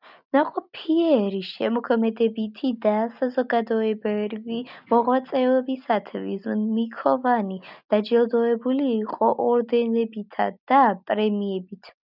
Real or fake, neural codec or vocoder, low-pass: real; none; 5.4 kHz